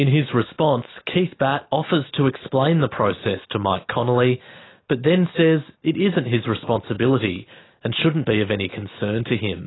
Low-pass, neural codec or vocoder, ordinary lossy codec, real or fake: 7.2 kHz; none; AAC, 16 kbps; real